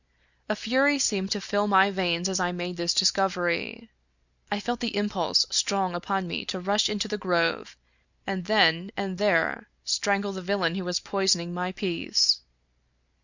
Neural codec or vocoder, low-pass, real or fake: none; 7.2 kHz; real